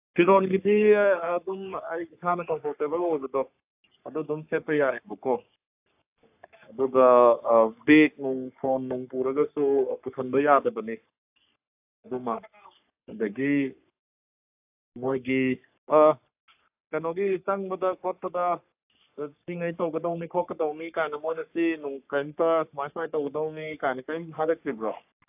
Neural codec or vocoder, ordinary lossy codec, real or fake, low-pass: codec, 44.1 kHz, 3.4 kbps, Pupu-Codec; AAC, 32 kbps; fake; 3.6 kHz